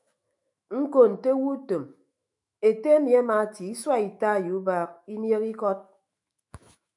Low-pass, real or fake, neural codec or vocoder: 10.8 kHz; fake; autoencoder, 48 kHz, 128 numbers a frame, DAC-VAE, trained on Japanese speech